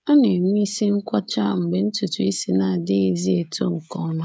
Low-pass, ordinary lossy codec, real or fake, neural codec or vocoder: none; none; fake; codec, 16 kHz, 16 kbps, FreqCodec, smaller model